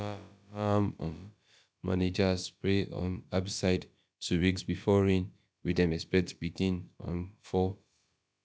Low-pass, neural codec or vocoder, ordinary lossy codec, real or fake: none; codec, 16 kHz, about 1 kbps, DyCAST, with the encoder's durations; none; fake